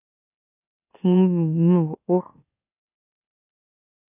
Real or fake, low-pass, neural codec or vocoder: fake; 3.6 kHz; autoencoder, 44.1 kHz, a latent of 192 numbers a frame, MeloTTS